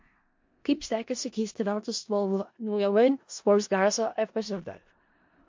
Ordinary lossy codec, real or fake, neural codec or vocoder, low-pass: MP3, 48 kbps; fake; codec, 16 kHz in and 24 kHz out, 0.4 kbps, LongCat-Audio-Codec, four codebook decoder; 7.2 kHz